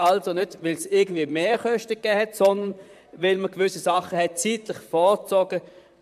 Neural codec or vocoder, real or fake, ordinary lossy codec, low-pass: vocoder, 44.1 kHz, 128 mel bands, Pupu-Vocoder; fake; MP3, 96 kbps; 14.4 kHz